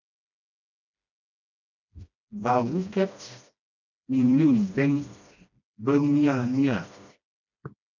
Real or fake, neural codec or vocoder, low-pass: fake; codec, 16 kHz, 1 kbps, FreqCodec, smaller model; 7.2 kHz